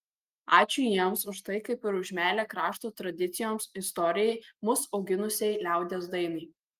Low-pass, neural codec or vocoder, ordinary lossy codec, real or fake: 14.4 kHz; none; Opus, 32 kbps; real